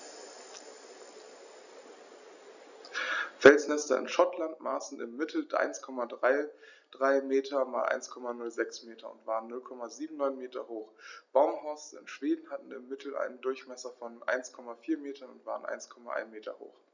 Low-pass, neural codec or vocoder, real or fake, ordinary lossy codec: 7.2 kHz; none; real; none